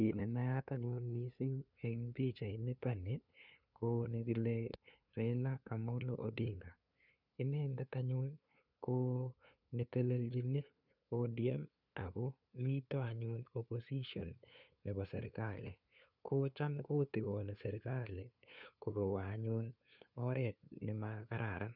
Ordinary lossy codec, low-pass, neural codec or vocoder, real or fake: none; 5.4 kHz; codec, 16 kHz, 2 kbps, FunCodec, trained on LibriTTS, 25 frames a second; fake